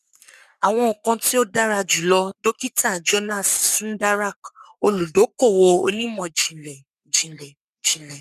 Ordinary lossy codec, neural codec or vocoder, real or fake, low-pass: none; codec, 44.1 kHz, 3.4 kbps, Pupu-Codec; fake; 14.4 kHz